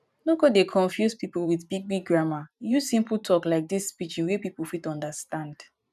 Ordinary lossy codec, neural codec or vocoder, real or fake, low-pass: none; vocoder, 44.1 kHz, 128 mel bands every 512 samples, BigVGAN v2; fake; 14.4 kHz